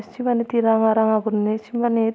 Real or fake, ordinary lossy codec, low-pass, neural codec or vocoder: real; none; none; none